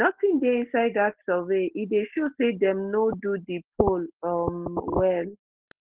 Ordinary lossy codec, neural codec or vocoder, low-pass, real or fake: Opus, 16 kbps; none; 3.6 kHz; real